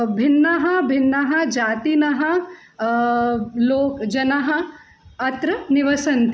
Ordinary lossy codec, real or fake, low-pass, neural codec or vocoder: none; real; none; none